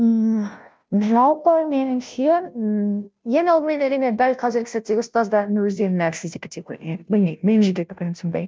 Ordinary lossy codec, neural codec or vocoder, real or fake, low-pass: none; codec, 16 kHz, 0.5 kbps, FunCodec, trained on Chinese and English, 25 frames a second; fake; none